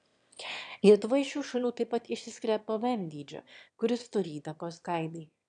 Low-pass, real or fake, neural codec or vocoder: 9.9 kHz; fake; autoencoder, 22.05 kHz, a latent of 192 numbers a frame, VITS, trained on one speaker